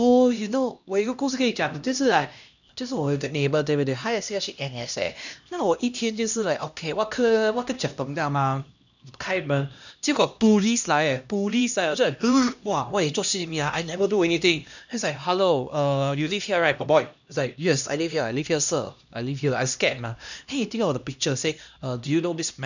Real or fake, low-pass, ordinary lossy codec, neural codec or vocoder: fake; 7.2 kHz; none; codec, 16 kHz, 1 kbps, X-Codec, HuBERT features, trained on LibriSpeech